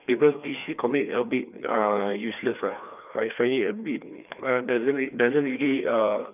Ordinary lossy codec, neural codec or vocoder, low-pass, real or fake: none; codec, 16 kHz, 2 kbps, FreqCodec, larger model; 3.6 kHz; fake